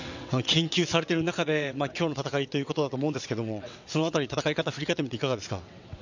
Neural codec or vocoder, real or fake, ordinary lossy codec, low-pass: vocoder, 22.05 kHz, 80 mel bands, WaveNeXt; fake; none; 7.2 kHz